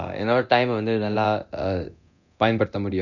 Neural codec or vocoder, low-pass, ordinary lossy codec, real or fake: codec, 24 kHz, 0.9 kbps, DualCodec; 7.2 kHz; none; fake